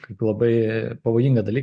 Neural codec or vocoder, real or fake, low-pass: none; real; 9.9 kHz